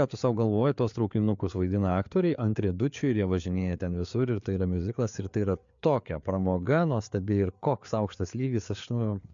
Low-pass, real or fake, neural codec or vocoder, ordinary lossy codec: 7.2 kHz; fake; codec, 16 kHz, 4 kbps, FreqCodec, larger model; MP3, 64 kbps